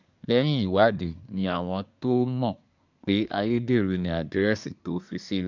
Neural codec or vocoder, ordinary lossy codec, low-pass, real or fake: codec, 24 kHz, 1 kbps, SNAC; none; 7.2 kHz; fake